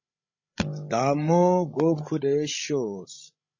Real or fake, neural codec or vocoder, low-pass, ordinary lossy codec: fake; codec, 16 kHz, 16 kbps, FreqCodec, larger model; 7.2 kHz; MP3, 32 kbps